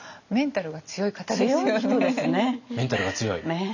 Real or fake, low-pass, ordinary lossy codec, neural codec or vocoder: real; 7.2 kHz; none; none